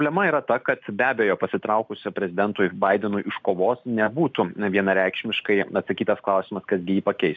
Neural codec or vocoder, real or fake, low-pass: none; real; 7.2 kHz